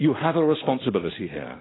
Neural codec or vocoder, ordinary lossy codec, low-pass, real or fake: none; AAC, 16 kbps; 7.2 kHz; real